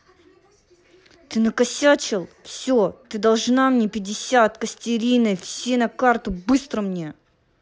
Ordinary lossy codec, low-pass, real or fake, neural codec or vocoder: none; none; real; none